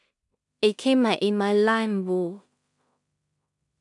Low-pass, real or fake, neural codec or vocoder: 10.8 kHz; fake; codec, 16 kHz in and 24 kHz out, 0.4 kbps, LongCat-Audio-Codec, two codebook decoder